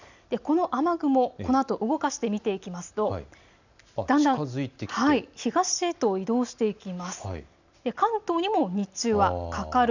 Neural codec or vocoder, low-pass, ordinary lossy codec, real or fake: none; 7.2 kHz; none; real